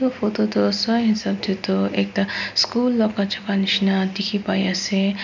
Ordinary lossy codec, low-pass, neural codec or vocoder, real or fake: none; 7.2 kHz; none; real